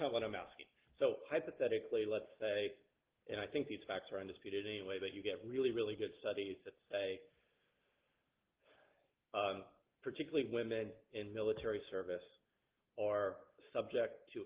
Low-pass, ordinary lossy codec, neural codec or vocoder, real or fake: 3.6 kHz; Opus, 16 kbps; none; real